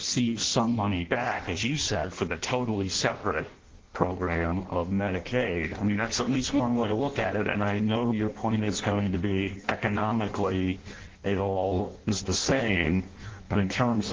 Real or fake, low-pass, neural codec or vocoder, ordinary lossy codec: fake; 7.2 kHz; codec, 16 kHz in and 24 kHz out, 0.6 kbps, FireRedTTS-2 codec; Opus, 16 kbps